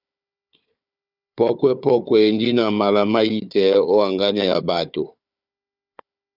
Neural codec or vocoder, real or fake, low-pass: codec, 16 kHz, 4 kbps, FunCodec, trained on Chinese and English, 50 frames a second; fake; 5.4 kHz